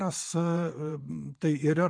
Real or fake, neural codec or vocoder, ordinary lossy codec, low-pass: fake; vocoder, 22.05 kHz, 80 mel bands, WaveNeXt; MP3, 64 kbps; 9.9 kHz